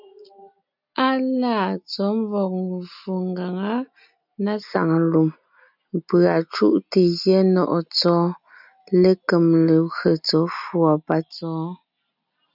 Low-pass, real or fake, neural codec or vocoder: 5.4 kHz; real; none